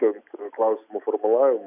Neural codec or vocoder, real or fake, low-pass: none; real; 3.6 kHz